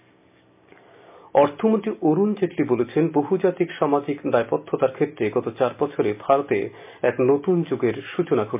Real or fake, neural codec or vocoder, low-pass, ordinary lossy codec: real; none; 3.6 kHz; MP3, 32 kbps